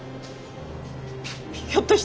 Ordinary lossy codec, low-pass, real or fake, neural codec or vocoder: none; none; real; none